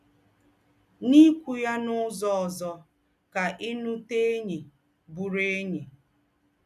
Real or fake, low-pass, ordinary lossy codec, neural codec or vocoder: real; 14.4 kHz; none; none